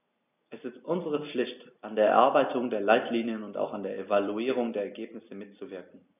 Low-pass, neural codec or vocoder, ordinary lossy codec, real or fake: 3.6 kHz; none; none; real